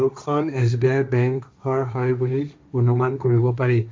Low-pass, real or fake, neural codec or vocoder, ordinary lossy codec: none; fake; codec, 16 kHz, 1.1 kbps, Voila-Tokenizer; none